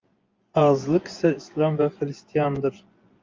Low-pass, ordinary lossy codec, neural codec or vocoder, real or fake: 7.2 kHz; Opus, 32 kbps; vocoder, 24 kHz, 100 mel bands, Vocos; fake